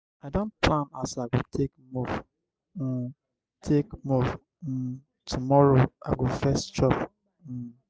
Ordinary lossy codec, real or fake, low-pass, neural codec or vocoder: none; real; none; none